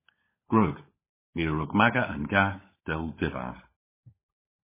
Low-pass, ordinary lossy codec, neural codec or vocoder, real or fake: 3.6 kHz; MP3, 16 kbps; codec, 16 kHz, 16 kbps, FunCodec, trained on LibriTTS, 50 frames a second; fake